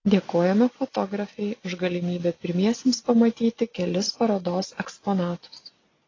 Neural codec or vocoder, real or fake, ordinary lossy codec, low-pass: none; real; AAC, 32 kbps; 7.2 kHz